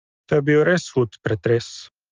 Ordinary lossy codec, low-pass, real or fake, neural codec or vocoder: Opus, 24 kbps; 7.2 kHz; real; none